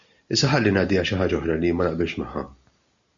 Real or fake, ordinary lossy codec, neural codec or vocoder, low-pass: real; MP3, 64 kbps; none; 7.2 kHz